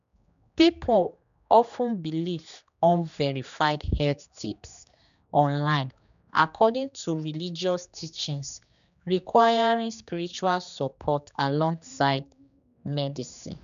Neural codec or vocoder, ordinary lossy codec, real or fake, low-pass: codec, 16 kHz, 2 kbps, X-Codec, HuBERT features, trained on general audio; none; fake; 7.2 kHz